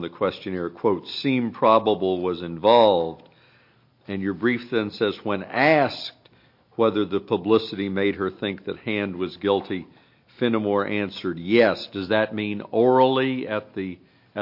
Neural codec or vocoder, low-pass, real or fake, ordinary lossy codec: none; 5.4 kHz; real; MP3, 32 kbps